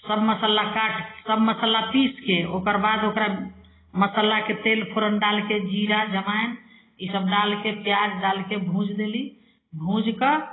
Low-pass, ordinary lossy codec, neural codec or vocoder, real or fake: 7.2 kHz; AAC, 16 kbps; none; real